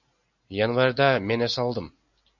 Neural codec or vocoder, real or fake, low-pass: none; real; 7.2 kHz